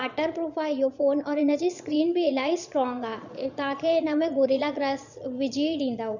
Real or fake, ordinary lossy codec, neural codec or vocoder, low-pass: fake; none; vocoder, 22.05 kHz, 80 mel bands, Vocos; 7.2 kHz